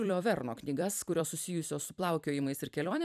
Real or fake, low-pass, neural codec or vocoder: fake; 14.4 kHz; vocoder, 44.1 kHz, 128 mel bands every 256 samples, BigVGAN v2